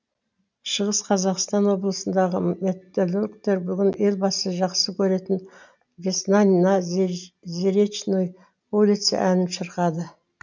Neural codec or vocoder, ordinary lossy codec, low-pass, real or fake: none; none; 7.2 kHz; real